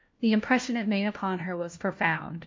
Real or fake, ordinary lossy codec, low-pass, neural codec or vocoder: fake; MP3, 48 kbps; 7.2 kHz; codec, 16 kHz, 1 kbps, FunCodec, trained on LibriTTS, 50 frames a second